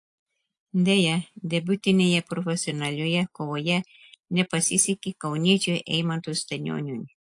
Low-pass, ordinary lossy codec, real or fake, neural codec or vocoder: 10.8 kHz; AAC, 64 kbps; real; none